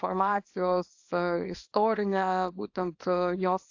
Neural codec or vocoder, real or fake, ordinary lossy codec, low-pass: codec, 24 kHz, 0.9 kbps, WavTokenizer, small release; fake; AAC, 48 kbps; 7.2 kHz